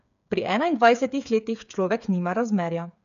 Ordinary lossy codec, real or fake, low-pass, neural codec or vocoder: none; fake; 7.2 kHz; codec, 16 kHz, 8 kbps, FreqCodec, smaller model